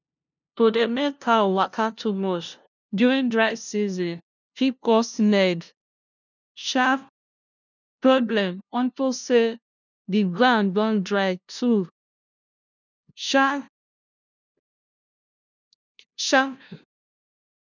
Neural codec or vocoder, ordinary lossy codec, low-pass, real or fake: codec, 16 kHz, 0.5 kbps, FunCodec, trained on LibriTTS, 25 frames a second; none; 7.2 kHz; fake